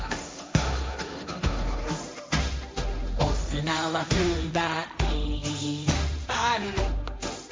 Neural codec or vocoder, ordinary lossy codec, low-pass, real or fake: codec, 16 kHz, 1.1 kbps, Voila-Tokenizer; none; none; fake